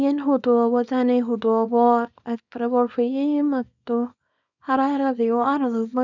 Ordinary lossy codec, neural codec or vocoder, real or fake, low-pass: none; codec, 24 kHz, 0.9 kbps, WavTokenizer, small release; fake; 7.2 kHz